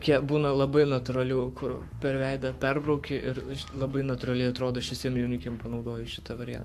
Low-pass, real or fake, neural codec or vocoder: 14.4 kHz; fake; codec, 44.1 kHz, 7.8 kbps, Pupu-Codec